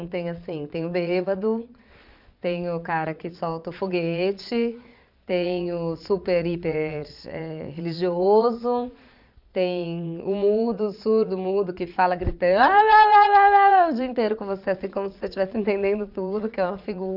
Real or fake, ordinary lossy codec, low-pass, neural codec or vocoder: fake; none; 5.4 kHz; vocoder, 22.05 kHz, 80 mel bands, Vocos